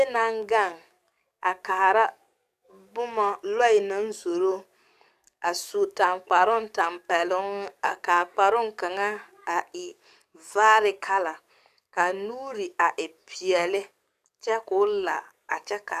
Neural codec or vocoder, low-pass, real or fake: codec, 44.1 kHz, 7.8 kbps, DAC; 14.4 kHz; fake